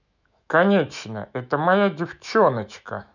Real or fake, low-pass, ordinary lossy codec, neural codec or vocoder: fake; 7.2 kHz; none; autoencoder, 48 kHz, 128 numbers a frame, DAC-VAE, trained on Japanese speech